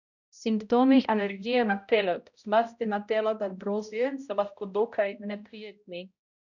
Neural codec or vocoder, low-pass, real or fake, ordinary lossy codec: codec, 16 kHz, 0.5 kbps, X-Codec, HuBERT features, trained on balanced general audio; 7.2 kHz; fake; none